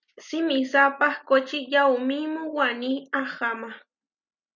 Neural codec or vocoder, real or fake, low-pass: none; real; 7.2 kHz